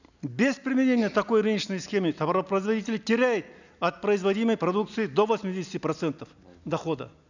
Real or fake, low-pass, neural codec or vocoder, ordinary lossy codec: real; 7.2 kHz; none; none